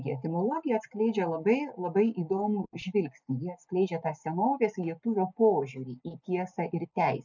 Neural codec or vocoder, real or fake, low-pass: none; real; 7.2 kHz